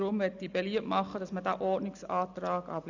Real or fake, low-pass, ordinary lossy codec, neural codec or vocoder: real; 7.2 kHz; none; none